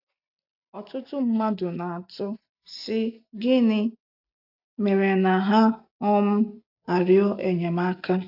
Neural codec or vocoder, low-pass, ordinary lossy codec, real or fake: none; 5.4 kHz; AAC, 48 kbps; real